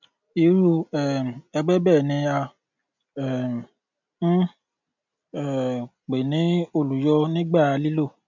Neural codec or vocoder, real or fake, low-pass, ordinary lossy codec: none; real; 7.2 kHz; none